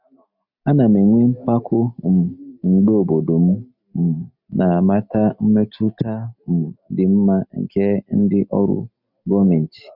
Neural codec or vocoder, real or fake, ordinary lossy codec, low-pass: none; real; none; 5.4 kHz